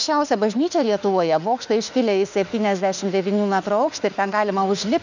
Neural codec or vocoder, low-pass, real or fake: autoencoder, 48 kHz, 32 numbers a frame, DAC-VAE, trained on Japanese speech; 7.2 kHz; fake